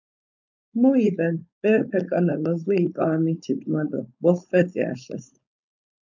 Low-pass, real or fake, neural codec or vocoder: 7.2 kHz; fake; codec, 16 kHz, 4.8 kbps, FACodec